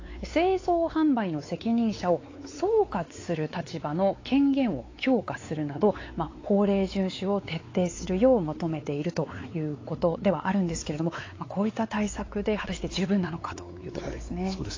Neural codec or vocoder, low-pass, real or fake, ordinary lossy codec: codec, 16 kHz, 4 kbps, X-Codec, WavLM features, trained on Multilingual LibriSpeech; 7.2 kHz; fake; AAC, 32 kbps